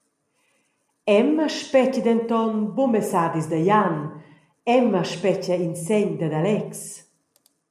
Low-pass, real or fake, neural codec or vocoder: 14.4 kHz; real; none